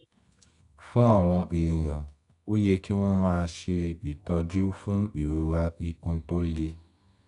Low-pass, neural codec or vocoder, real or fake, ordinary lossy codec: 10.8 kHz; codec, 24 kHz, 0.9 kbps, WavTokenizer, medium music audio release; fake; none